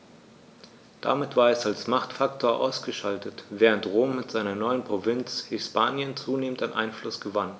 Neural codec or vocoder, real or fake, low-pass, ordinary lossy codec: none; real; none; none